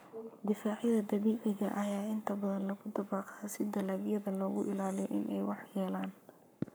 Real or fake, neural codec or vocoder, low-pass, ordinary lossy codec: fake; codec, 44.1 kHz, 7.8 kbps, Pupu-Codec; none; none